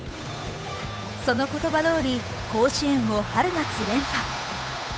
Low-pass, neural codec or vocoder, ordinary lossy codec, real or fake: none; codec, 16 kHz, 8 kbps, FunCodec, trained on Chinese and English, 25 frames a second; none; fake